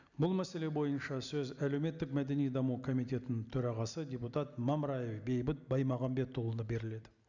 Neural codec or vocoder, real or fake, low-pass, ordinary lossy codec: none; real; 7.2 kHz; none